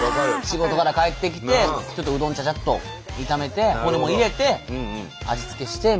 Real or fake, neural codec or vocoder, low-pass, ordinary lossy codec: real; none; none; none